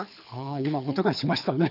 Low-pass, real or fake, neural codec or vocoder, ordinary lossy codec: 5.4 kHz; fake; codec, 24 kHz, 6 kbps, HILCodec; none